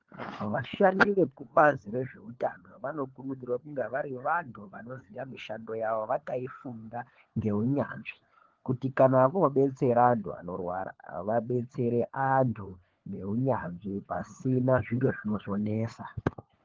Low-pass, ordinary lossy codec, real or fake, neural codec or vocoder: 7.2 kHz; Opus, 16 kbps; fake; codec, 16 kHz, 4 kbps, FunCodec, trained on LibriTTS, 50 frames a second